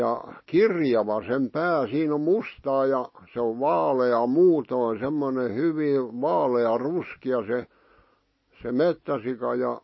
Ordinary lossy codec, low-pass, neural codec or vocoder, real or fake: MP3, 24 kbps; 7.2 kHz; none; real